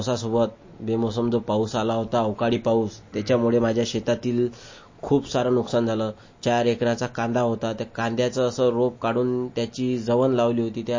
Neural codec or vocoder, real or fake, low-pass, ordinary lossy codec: none; real; 7.2 kHz; MP3, 32 kbps